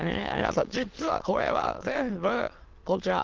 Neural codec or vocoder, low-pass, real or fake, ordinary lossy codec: autoencoder, 22.05 kHz, a latent of 192 numbers a frame, VITS, trained on many speakers; 7.2 kHz; fake; Opus, 16 kbps